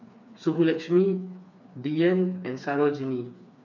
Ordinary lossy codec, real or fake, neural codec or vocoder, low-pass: none; fake; codec, 16 kHz, 4 kbps, FreqCodec, smaller model; 7.2 kHz